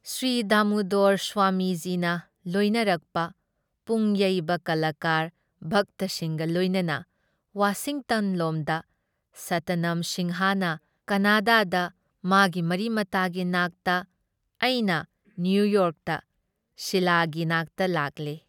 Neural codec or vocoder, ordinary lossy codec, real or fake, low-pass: none; none; real; 19.8 kHz